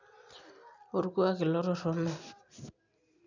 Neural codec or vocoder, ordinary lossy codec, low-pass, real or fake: none; none; 7.2 kHz; real